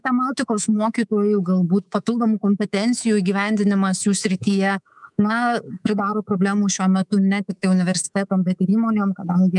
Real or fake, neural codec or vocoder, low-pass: fake; codec, 24 kHz, 3.1 kbps, DualCodec; 10.8 kHz